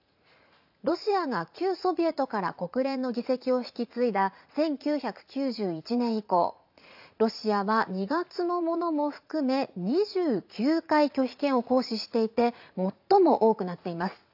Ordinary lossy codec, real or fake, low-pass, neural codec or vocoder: AAC, 48 kbps; fake; 5.4 kHz; vocoder, 44.1 kHz, 80 mel bands, Vocos